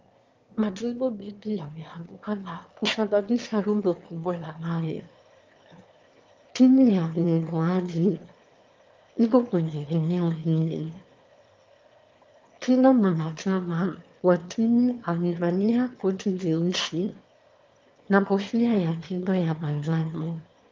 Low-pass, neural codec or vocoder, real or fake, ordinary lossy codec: 7.2 kHz; autoencoder, 22.05 kHz, a latent of 192 numbers a frame, VITS, trained on one speaker; fake; Opus, 32 kbps